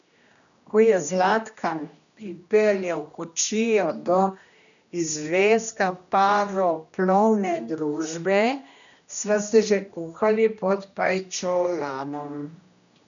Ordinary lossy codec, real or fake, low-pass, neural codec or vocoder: none; fake; 7.2 kHz; codec, 16 kHz, 1 kbps, X-Codec, HuBERT features, trained on general audio